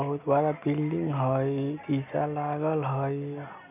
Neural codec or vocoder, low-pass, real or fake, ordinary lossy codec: none; 3.6 kHz; real; none